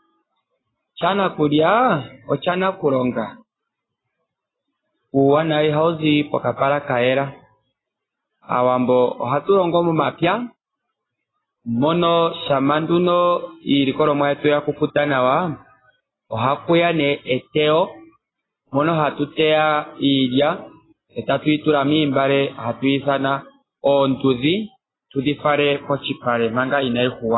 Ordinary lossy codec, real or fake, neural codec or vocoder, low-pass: AAC, 16 kbps; real; none; 7.2 kHz